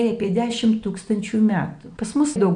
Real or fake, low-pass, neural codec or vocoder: real; 9.9 kHz; none